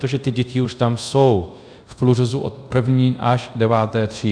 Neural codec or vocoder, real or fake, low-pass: codec, 24 kHz, 0.5 kbps, DualCodec; fake; 9.9 kHz